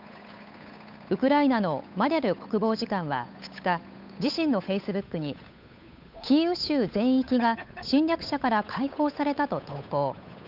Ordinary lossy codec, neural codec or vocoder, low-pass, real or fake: none; codec, 16 kHz, 8 kbps, FunCodec, trained on Chinese and English, 25 frames a second; 5.4 kHz; fake